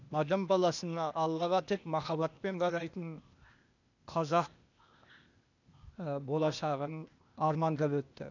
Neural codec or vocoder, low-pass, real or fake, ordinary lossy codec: codec, 16 kHz, 0.8 kbps, ZipCodec; 7.2 kHz; fake; none